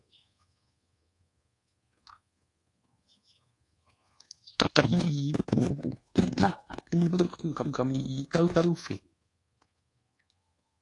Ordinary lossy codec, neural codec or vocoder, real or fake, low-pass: AAC, 48 kbps; codec, 24 kHz, 1.2 kbps, DualCodec; fake; 10.8 kHz